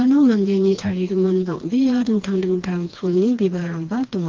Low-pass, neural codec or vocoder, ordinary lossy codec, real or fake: 7.2 kHz; codec, 16 kHz, 2 kbps, FreqCodec, smaller model; Opus, 32 kbps; fake